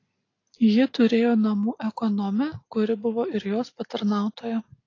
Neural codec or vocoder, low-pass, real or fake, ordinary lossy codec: none; 7.2 kHz; real; AAC, 32 kbps